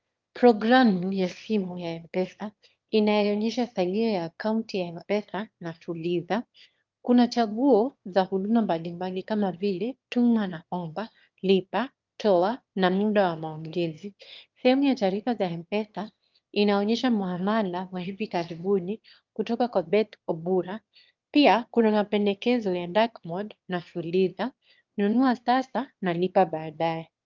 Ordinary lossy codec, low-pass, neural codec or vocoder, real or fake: Opus, 32 kbps; 7.2 kHz; autoencoder, 22.05 kHz, a latent of 192 numbers a frame, VITS, trained on one speaker; fake